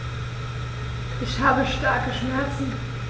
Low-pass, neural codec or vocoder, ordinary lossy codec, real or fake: none; none; none; real